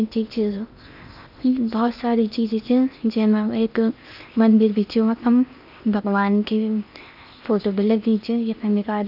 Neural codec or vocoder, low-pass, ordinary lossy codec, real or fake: codec, 16 kHz in and 24 kHz out, 0.8 kbps, FocalCodec, streaming, 65536 codes; 5.4 kHz; none; fake